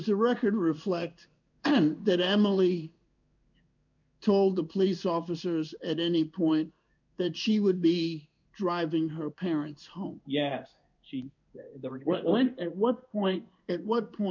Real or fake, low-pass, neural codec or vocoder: fake; 7.2 kHz; codec, 16 kHz in and 24 kHz out, 1 kbps, XY-Tokenizer